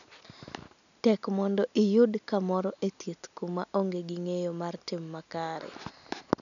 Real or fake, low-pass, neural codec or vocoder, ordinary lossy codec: real; 7.2 kHz; none; none